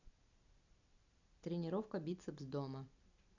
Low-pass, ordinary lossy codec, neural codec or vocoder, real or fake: 7.2 kHz; none; none; real